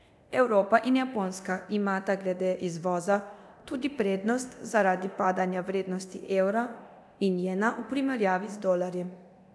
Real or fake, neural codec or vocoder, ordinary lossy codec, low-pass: fake; codec, 24 kHz, 0.9 kbps, DualCodec; none; none